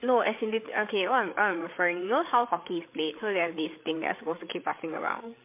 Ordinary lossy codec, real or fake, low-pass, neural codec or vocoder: MP3, 24 kbps; fake; 3.6 kHz; codec, 16 kHz, 8 kbps, FreqCodec, larger model